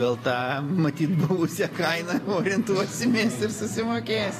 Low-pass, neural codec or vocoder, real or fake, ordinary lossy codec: 14.4 kHz; vocoder, 44.1 kHz, 128 mel bands every 512 samples, BigVGAN v2; fake; AAC, 48 kbps